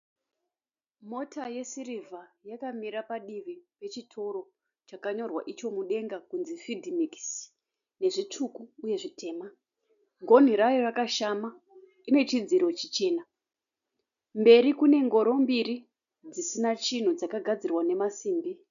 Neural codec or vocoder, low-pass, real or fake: none; 7.2 kHz; real